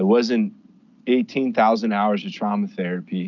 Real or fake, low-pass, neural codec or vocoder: real; 7.2 kHz; none